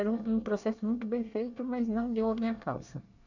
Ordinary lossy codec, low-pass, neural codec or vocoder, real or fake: none; 7.2 kHz; codec, 24 kHz, 1 kbps, SNAC; fake